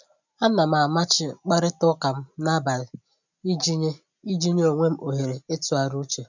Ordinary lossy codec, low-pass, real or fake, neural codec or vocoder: none; 7.2 kHz; real; none